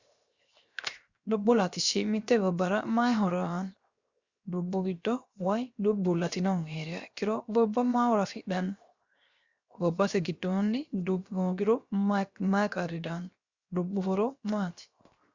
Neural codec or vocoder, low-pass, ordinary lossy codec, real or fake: codec, 16 kHz, 0.7 kbps, FocalCodec; 7.2 kHz; Opus, 64 kbps; fake